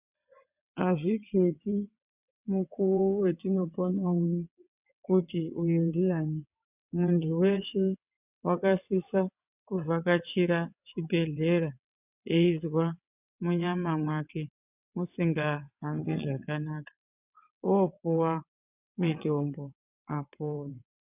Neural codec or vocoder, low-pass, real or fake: vocoder, 22.05 kHz, 80 mel bands, WaveNeXt; 3.6 kHz; fake